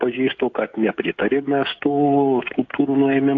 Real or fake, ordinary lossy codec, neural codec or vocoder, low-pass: fake; AAC, 48 kbps; codec, 16 kHz, 16 kbps, FreqCodec, smaller model; 7.2 kHz